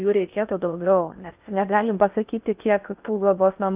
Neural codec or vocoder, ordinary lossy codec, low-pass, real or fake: codec, 16 kHz in and 24 kHz out, 0.6 kbps, FocalCodec, streaming, 4096 codes; Opus, 24 kbps; 3.6 kHz; fake